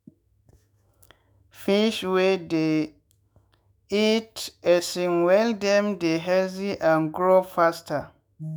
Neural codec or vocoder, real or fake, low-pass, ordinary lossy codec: autoencoder, 48 kHz, 128 numbers a frame, DAC-VAE, trained on Japanese speech; fake; none; none